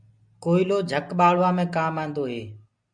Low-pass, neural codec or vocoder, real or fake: 9.9 kHz; none; real